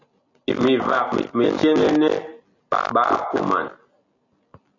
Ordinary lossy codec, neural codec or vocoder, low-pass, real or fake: AAC, 48 kbps; none; 7.2 kHz; real